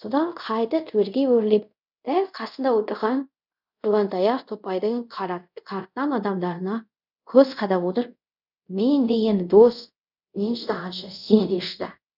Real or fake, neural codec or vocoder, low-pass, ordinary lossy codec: fake; codec, 24 kHz, 0.5 kbps, DualCodec; 5.4 kHz; none